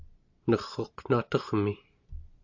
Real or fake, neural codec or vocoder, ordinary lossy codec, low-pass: real; none; AAC, 48 kbps; 7.2 kHz